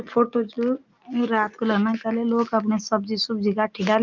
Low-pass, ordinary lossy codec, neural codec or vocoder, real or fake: 7.2 kHz; Opus, 24 kbps; none; real